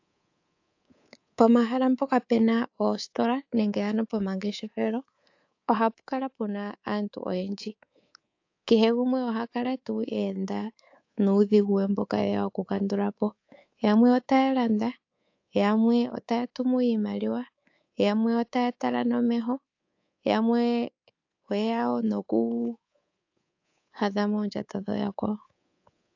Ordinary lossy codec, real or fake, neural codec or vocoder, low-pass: AAC, 48 kbps; fake; codec, 24 kHz, 3.1 kbps, DualCodec; 7.2 kHz